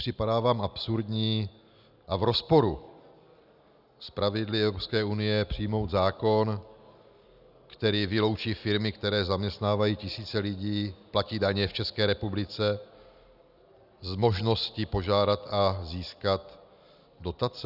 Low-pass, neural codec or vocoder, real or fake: 5.4 kHz; none; real